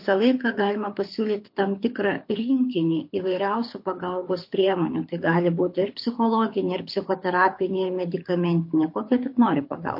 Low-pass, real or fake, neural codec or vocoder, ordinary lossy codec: 5.4 kHz; fake; codec, 24 kHz, 6 kbps, HILCodec; MP3, 32 kbps